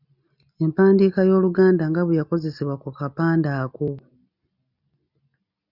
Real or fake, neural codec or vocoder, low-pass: real; none; 5.4 kHz